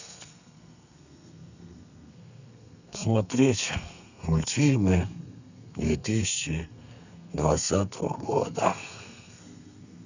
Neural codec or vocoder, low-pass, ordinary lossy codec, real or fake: codec, 32 kHz, 1.9 kbps, SNAC; 7.2 kHz; none; fake